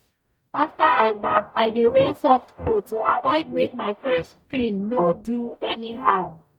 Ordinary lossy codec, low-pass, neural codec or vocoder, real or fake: MP3, 96 kbps; 19.8 kHz; codec, 44.1 kHz, 0.9 kbps, DAC; fake